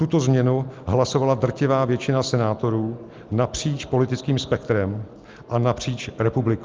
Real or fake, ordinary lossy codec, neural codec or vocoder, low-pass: real; Opus, 32 kbps; none; 7.2 kHz